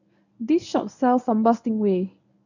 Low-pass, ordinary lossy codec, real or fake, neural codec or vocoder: 7.2 kHz; none; fake; codec, 24 kHz, 0.9 kbps, WavTokenizer, medium speech release version 1